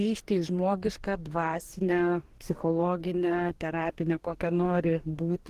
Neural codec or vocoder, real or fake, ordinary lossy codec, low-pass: codec, 44.1 kHz, 2.6 kbps, DAC; fake; Opus, 16 kbps; 14.4 kHz